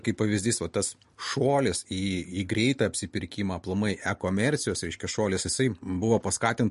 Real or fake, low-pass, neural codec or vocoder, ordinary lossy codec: real; 14.4 kHz; none; MP3, 48 kbps